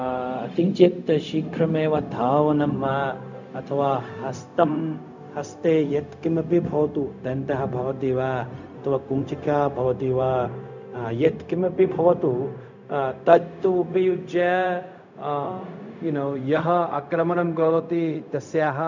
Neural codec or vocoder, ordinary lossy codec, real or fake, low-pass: codec, 16 kHz, 0.4 kbps, LongCat-Audio-Codec; none; fake; 7.2 kHz